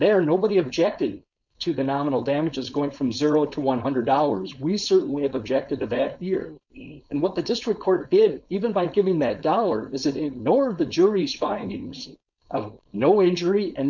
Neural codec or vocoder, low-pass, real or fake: codec, 16 kHz, 4.8 kbps, FACodec; 7.2 kHz; fake